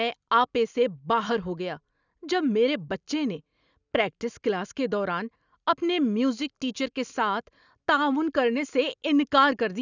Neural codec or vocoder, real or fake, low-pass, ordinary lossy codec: none; real; 7.2 kHz; none